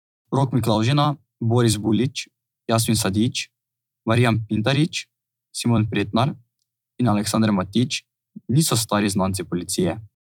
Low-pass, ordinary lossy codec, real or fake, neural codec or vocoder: 19.8 kHz; none; fake; vocoder, 44.1 kHz, 128 mel bands every 256 samples, BigVGAN v2